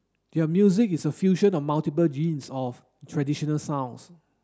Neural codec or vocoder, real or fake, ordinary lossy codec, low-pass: none; real; none; none